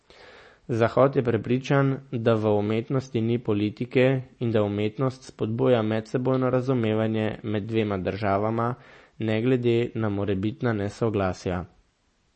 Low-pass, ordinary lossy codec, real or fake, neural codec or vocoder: 10.8 kHz; MP3, 32 kbps; real; none